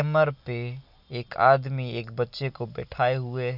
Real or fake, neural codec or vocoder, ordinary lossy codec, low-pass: real; none; none; 5.4 kHz